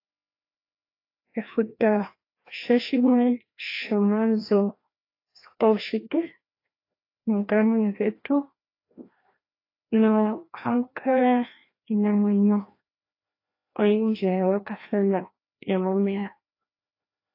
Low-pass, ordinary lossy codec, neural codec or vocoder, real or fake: 5.4 kHz; AAC, 32 kbps; codec, 16 kHz, 1 kbps, FreqCodec, larger model; fake